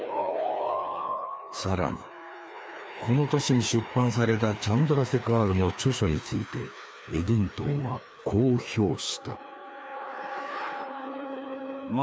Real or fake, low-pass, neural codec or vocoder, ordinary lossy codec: fake; none; codec, 16 kHz, 2 kbps, FreqCodec, larger model; none